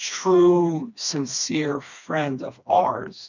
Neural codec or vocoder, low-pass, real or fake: codec, 16 kHz, 2 kbps, FreqCodec, smaller model; 7.2 kHz; fake